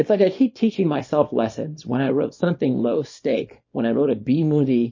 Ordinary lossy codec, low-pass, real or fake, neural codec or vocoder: MP3, 32 kbps; 7.2 kHz; fake; codec, 24 kHz, 0.9 kbps, WavTokenizer, small release